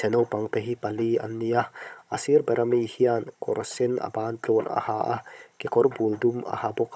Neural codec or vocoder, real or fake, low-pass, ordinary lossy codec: codec, 16 kHz, 16 kbps, FunCodec, trained on Chinese and English, 50 frames a second; fake; none; none